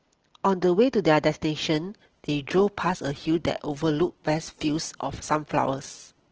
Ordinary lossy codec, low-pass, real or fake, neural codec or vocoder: Opus, 16 kbps; 7.2 kHz; real; none